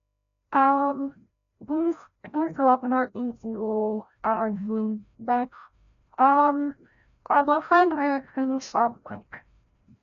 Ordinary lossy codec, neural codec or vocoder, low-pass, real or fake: none; codec, 16 kHz, 0.5 kbps, FreqCodec, larger model; 7.2 kHz; fake